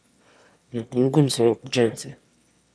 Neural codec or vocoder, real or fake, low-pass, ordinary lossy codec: autoencoder, 22.05 kHz, a latent of 192 numbers a frame, VITS, trained on one speaker; fake; none; none